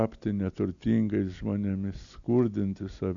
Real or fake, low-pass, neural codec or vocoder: real; 7.2 kHz; none